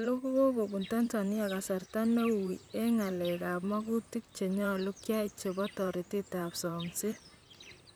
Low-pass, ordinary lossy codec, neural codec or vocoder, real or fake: none; none; vocoder, 44.1 kHz, 128 mel bands, Pupu-Vocoder; fake